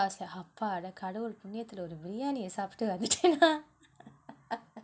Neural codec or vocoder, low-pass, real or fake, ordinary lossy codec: none; none; real; none